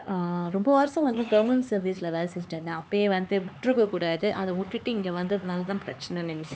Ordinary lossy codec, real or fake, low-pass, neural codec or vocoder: none; fake; none; codec, 16 kHz, 4 kbps, X-Codec, HuBERT features, trained on LibriSpeech